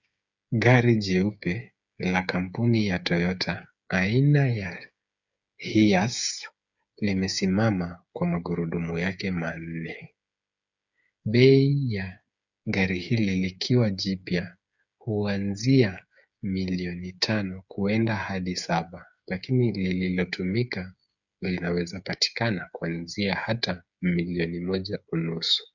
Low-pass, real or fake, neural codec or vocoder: 7.2 kHz; fake; codec, 16 kHz, 8 kbps, FreqCodec, smaller model